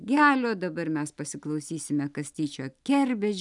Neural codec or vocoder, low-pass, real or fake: none; 10.8 kHz; real